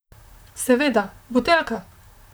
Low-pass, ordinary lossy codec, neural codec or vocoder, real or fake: none; none; vocoder, 44.1 kHz, 128 mel bands, Pupu-Vocoder; fake